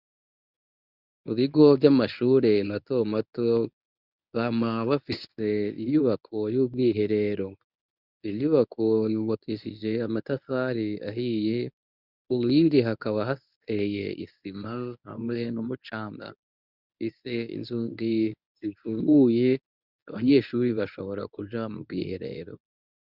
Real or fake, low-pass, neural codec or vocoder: fake; 5.4 kHz; codec, 24 kHz, 0.9 kbps, WavTokenizer, medium speech release version 1